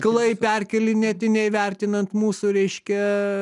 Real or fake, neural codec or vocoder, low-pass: real; none; 10.8 kHz